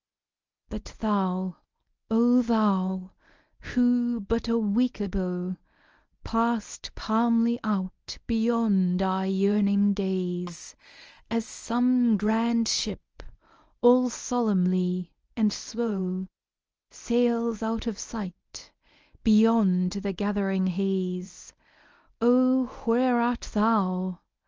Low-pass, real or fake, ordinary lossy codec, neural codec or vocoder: 7.2 kHz; fake; Opus, 24 kbps; codec, 24 kHz, 0.9 kbps, WavTokenizer, medium speech release version 1